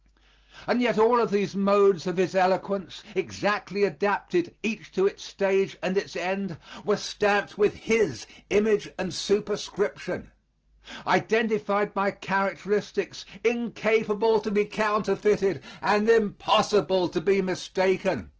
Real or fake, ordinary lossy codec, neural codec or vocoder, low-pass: real; Opus, 16 kbps; none; 7.2 kHz